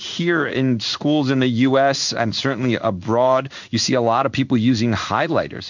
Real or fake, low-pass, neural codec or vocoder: fake; 7.2 kHz; codec, 16 kHz in and 24 kHz out, 1 kbps, XY-Tokenizer